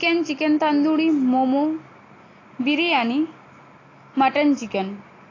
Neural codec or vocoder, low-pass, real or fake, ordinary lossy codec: none; 7.2 kHz; real; AAC, 32 kbps